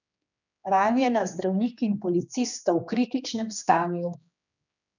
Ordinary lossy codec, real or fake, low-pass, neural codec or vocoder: none; fake; 7.2 kHz; codec, 16 kHz, 2 kbps, X-Codec, HuBERT features, trained on general audio